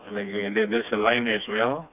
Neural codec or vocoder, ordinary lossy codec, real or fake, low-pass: codec, 16 kHz, 2 kbps, FreqCodec, smaller model; none; fake; 3.6 kHz